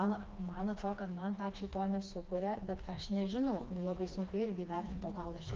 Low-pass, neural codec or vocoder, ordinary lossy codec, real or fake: 7.2 kHz; codec, 16 kHz, 2 kbps, FreqCodec, smaller model; Opus, 24 kbps; fake